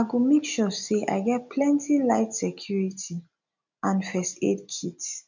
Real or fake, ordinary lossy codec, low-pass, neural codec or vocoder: real; none; 7.2 kHz; none